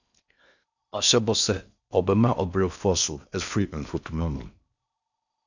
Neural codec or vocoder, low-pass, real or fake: codec, 16 kHz in and 24 kHz out, 0.6 kbps, FocalCodec, streaming, 4096 codes; 7.2 kHz; fake